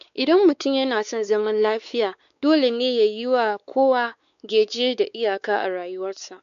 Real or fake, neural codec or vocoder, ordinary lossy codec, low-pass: fake; codec, 16 kHz, 4 kbps, X-Codec, WavLM features, trained on Multilingual LibriSpeech; none; 7.2 kHz